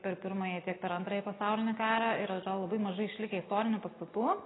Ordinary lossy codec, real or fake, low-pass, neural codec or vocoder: AAC, 16 kbps; real; 7.2 kHz; none